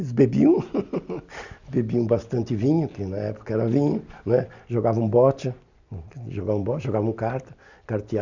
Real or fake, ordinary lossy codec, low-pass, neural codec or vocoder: real; none; 7.2 kHz; none